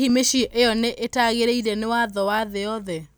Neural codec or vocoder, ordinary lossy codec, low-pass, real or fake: none; none; none; real